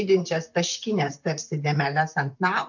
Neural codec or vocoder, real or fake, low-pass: vocoder, 44.1 kHz, 128 mel bands, Pupu-Vocoder; fake; 7.2 kHz